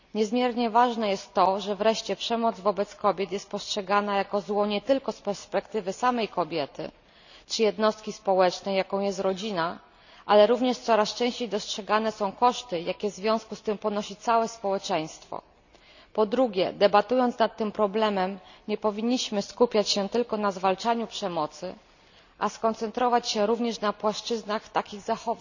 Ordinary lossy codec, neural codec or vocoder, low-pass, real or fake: none; none; 7.2 kHz; real